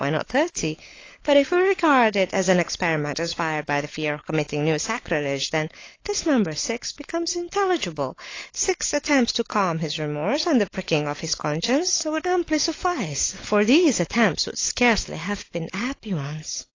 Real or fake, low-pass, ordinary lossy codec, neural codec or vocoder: fake; 7.2 kHz; AAC, 32 kbps; codec, 16 kHz, 16 kbps, FunCodec, trained on LibriTTS, 50 frames a second